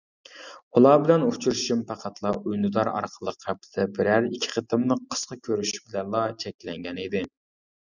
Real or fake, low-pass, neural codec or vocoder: real; 7.2 kHz; none